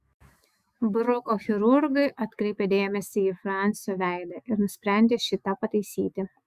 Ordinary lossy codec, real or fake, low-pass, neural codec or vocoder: Opus, 64 kbps; fake; 14.4 kHz; autoencoder, 48 kHz, 128 numbers a frame, DAC-VAE, trained on Japanese speech